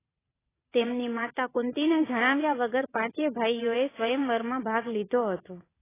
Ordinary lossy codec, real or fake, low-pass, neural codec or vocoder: AAC, 16 kbps; fake; 3.6 kHz; vocoder, 22.05 kHz, 80 mel bands, WaveNeXt